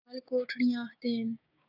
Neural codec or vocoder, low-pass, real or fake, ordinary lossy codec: codec, 16 kHz, 6 kbps, DAC; 5.4 kHz; fake; AAC, 32 kbps